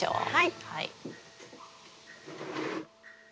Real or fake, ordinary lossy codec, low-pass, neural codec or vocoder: real; none; none; none